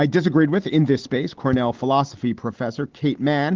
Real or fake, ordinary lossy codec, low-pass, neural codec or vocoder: real; Opus, 16 kbps; 7.2 kHz; none